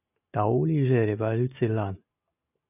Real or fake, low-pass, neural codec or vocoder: real; 3.6 kHz; none